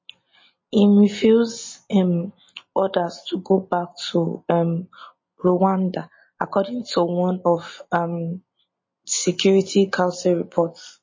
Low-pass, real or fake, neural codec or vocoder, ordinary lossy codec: 7.2 kHz; real; none; MP3, 32 kbps